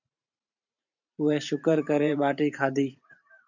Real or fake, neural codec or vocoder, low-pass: fake; vocoder, 44.1 kHz, 128 mel bands every 512 samples, BigVGAN v2; 7.2 kHz